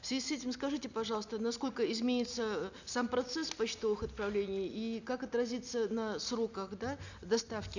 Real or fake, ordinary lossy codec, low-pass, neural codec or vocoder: real; none; 7.2 kHz; none